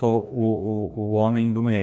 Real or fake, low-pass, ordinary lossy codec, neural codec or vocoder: fake; none; none; codec, 16 kHz, 1 kbps, FreqCodec, larger model